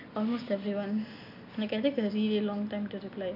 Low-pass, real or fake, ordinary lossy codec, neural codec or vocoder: 5.4 kHz; real; none; none